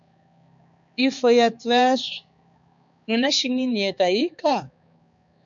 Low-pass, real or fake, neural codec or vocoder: 7.2 kHz; fake; codec, 16 kHz, 4 kbps, X-Codec, HuBERT features, trained on balanced general audio